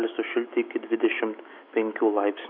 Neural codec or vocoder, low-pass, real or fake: autoencoder, 48 kHz, 128 numbers a frame, DAC-VAE, trained on Japanese speech; 5.4 kHz; fake